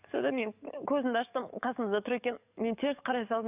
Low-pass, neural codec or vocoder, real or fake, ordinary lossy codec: 3.6 kHz; codec, 44.1 kHz, 7.8 kbps, DAC; fake; none